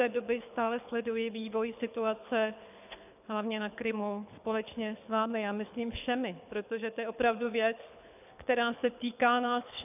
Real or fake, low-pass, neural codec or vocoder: fake; 3.6 kHz; codec, 24 kHz, 6 kbps, HILCodec